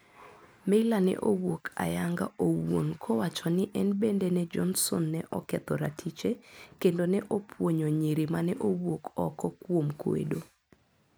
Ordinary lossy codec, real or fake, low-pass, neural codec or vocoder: none; real; none; none